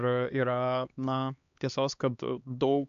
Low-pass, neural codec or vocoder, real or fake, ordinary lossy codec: 7.2 kHz; codec, 16 kHz, 2 kbps, X-Codec, HuBERT features, trained on LibriSpeech; fake; AAC, 96 kbps